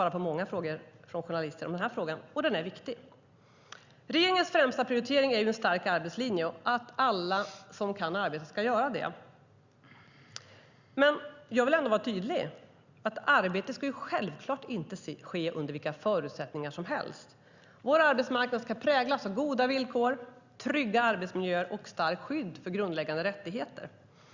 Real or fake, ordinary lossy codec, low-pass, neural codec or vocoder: real; Opus, 64 kbps; 7.2 kHz; none